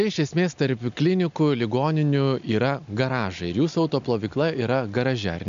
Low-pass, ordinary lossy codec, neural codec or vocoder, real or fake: 7.2 kHz; MP3, 64 kbps; none; real